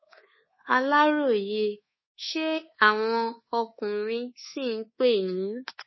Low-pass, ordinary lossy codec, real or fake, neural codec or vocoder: 7.2 kHz; MP3, 24 kbps; fake; codec, 24 kHz, 1.2 kbps, DualCodec